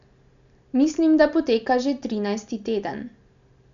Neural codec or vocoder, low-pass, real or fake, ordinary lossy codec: none; 7.2 kHz; real; none